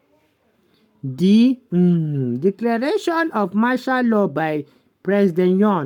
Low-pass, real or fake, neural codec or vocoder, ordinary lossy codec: 19.8 kHz; fake; codec, 44.1 kHz, 7.8 kbps, Pupu-Codec; none